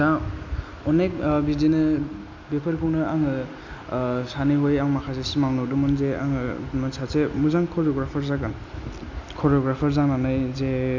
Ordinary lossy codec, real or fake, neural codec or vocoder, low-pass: MP3, 64 kbps; real; none; 7.2 kHz